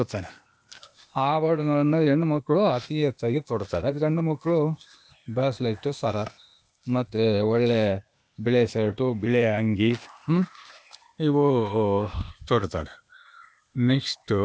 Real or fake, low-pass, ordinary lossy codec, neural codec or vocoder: fake; none; none; codec, 16 kHz, 0.8 kbps, ZipCodec